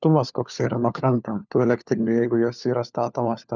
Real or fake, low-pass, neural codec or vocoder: fake; 7.2 kHz; codec, 16 kHz, 4 kbps, FunCodec, trained on LibriTTS, 50 frames a second